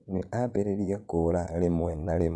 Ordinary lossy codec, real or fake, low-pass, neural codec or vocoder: none; fake; none; vocoder, 22.05 kHz, 80 mel bands, Vocos